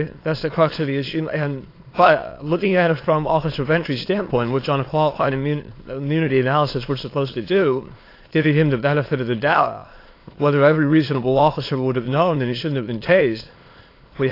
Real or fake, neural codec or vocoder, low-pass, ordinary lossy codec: fake; autoencoder, 22.05 kHz, a latent of 192 numbers a frame, VITS, trained on many speakers; 5.4 kHz; AAC, 32 kbps